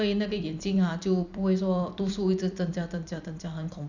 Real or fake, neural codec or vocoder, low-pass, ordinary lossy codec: fake; vocoder, 44.1 kHz, 128 mel bands every 256 samples, BigVGAN v2; 7.2 kHz; none